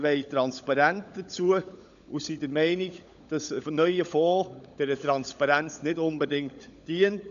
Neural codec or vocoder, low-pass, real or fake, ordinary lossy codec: codec, 16 kHz, 16 kbps, FunCodec, trained on LibriTTS, 50 frames a second; 7.2 kHz; fake; none